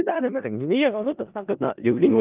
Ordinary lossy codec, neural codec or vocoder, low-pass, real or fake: Opus, 32 kbps; codec, 16 kHz in and 24 kHz out, 0.4 kbps, LongCat-Audio-Codec, four codebook decoder; 3.6 kHz; fake